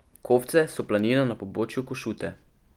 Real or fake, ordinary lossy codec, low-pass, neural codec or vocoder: real; Opus, 24 kbps; 19.8 kHz; none